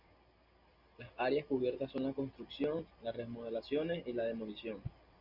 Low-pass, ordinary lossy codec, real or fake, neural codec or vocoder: 5.4 kHz; Opus, 64 kbps; real; none